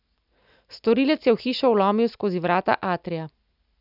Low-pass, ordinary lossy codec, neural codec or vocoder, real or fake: 5.4 kHz; none; none; real